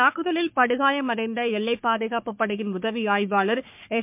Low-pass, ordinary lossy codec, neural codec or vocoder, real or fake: 3.6 kHz; MP3, 32 kbps; codec, 44.1 kHz, 3.4 kbps, Pupu-Codec; fake